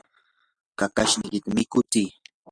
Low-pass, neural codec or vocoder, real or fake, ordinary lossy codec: 9.9 kHz; none; real; Opus, 64 kbps